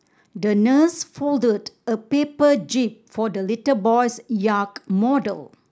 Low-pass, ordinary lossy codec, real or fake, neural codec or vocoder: none; none; real; none